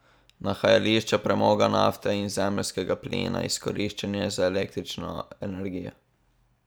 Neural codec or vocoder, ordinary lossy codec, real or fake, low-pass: none; none; real; none